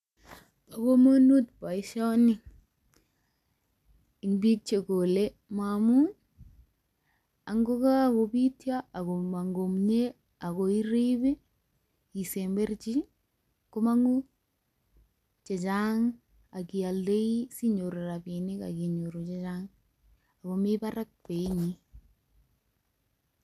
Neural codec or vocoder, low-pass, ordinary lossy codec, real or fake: none; 14.4 kHz; none; real